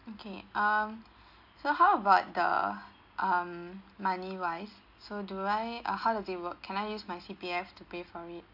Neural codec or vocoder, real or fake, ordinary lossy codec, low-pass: none; real; AAC, 48 kbps; 5.4 kHz